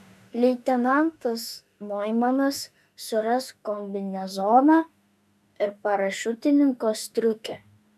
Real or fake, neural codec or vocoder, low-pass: fake; autoencoder, 48 kHz, 32 numbers a frame, DAC-VAE, trained on Japanese speech; 14.4 kHz